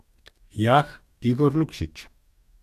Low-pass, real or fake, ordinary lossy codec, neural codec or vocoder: 14.4 kHz; fake; none; codec, 44.1 kHz, 2.6 kbps, DAC